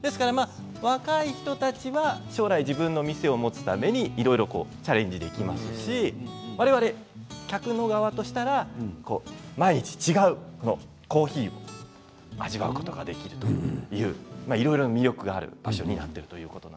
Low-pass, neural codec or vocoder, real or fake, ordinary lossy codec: none; none; real; none